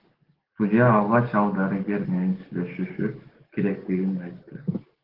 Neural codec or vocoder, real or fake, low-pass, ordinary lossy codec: codec, 44.1 kHz, 7.8 kbps, DAC; fake; 5.4 kHz; Opus, 16 kbps